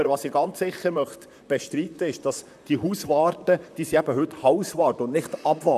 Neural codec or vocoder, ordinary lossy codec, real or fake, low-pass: vocoder, 44.1 kHz, 128 mel bands, Pupu-Vocoder; none; fake; 14.4 kHz